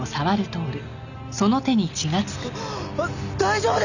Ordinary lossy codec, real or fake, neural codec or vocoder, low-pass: none; real; none; 7.2 kHz